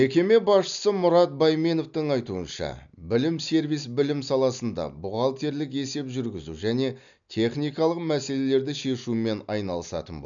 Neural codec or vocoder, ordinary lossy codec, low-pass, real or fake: none; none; 7.2 kHz; real